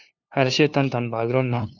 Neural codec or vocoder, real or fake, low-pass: codec, 16 kHz, 2 kbps, FunCodec, trained on LibriTTS, 25 frames a second; fake; 7.2 kHz